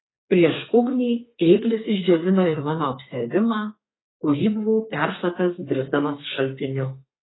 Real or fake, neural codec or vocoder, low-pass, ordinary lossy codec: fake; codec, 32 kHz, 1.9 kbps, SNAC; 7.2 kHz; AAC, 16 kbps